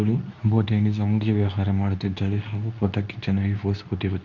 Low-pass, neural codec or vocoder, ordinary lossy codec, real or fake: 7.2 kHz; codec, 24 kHz, 0.9 kbps, WavTokenizer, medium speech release version 2; none; fake